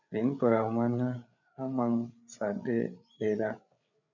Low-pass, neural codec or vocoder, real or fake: 7.2 kHz; codec, 16 kHz, 8 kbps, FreqCodec, larger model; fake